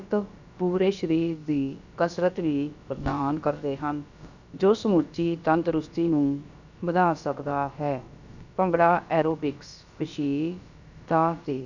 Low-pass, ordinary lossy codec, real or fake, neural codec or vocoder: 7.2 kHz; none; fake; codec, 16 kHz, about 1 kbps, DyCAST, with the encoder's durations